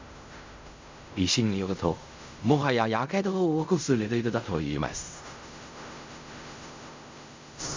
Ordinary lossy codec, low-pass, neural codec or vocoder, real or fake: MP3, 64 kbps; 7.2 kHz; codec, 16 kHz in and 24 kHz out, 0.4 kbps, LongCat-Audio-Codec, fine tuned four codebook decoder; fake